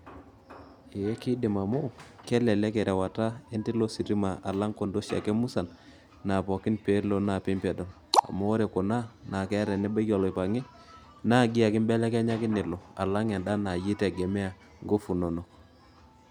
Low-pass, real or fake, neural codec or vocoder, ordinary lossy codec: 19.8 kHz; real; none; none